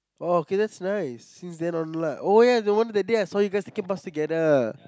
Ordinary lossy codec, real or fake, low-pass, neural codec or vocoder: none; real; none; none